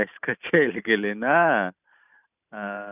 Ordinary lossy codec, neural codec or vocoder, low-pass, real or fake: none; vocoder, 44.1 kHz, 128 mel bands every 512 samples, BigVGAN v2; 3.6 kHz; fake